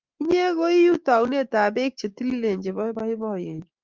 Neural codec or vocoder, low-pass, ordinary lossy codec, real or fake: codec, 16 kHz, 6 kbps, DAC; 7.2 kHz; Opus, 32 kbps; fake